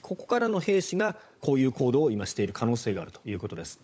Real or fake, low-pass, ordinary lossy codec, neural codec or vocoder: fake; none; none; codec, 16 kHz, 16 kbps, FunCodec, trained on LibriTTS, 50 frames a second